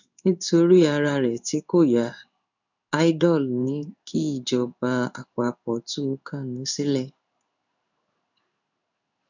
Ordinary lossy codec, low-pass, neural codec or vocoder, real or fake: none; 7.2 kHz; codec, 16 kHz in and 24 kHz out, 1 kbps, XY-Tokenizer; fake